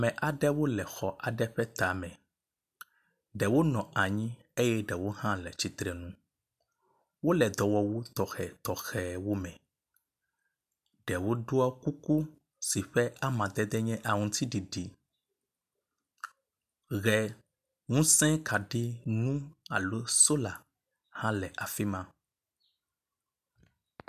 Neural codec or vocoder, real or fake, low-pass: none; real; 14.4 kHz